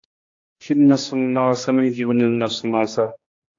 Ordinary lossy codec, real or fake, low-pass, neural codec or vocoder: AAC, 48 kbps; fake; 7.2 kHz; codec, 16 kHz, 1 kbps, X-Codec, HuBERT features, trained on general audio